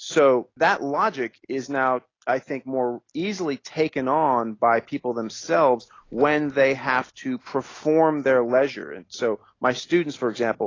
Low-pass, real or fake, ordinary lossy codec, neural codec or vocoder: 7.2 kHz; real; AAC, 32 kbps; none